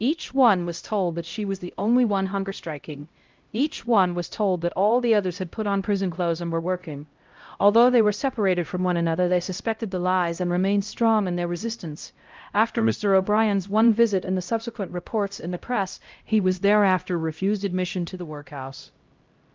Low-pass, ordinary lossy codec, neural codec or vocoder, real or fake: 7.2 kHz; Opus, 32 kbps; codec, 16 kHz, 0.5 kbps, X-Codec, HuBERT features, trained on LibriSpeech; fake